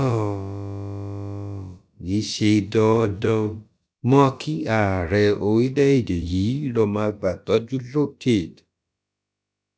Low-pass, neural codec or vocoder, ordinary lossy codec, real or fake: none; codec, 16 kHz, about 1 kbps, DyCAST, with the encoder's durations; none; fake